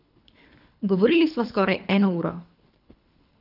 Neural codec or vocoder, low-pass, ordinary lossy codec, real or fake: codec, 24 kHz, 3 kbps, HILCodec; 5.4 kHz; none; fake